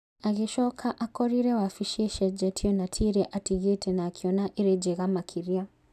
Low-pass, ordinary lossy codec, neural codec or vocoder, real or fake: 14.4 kHz; none; none; real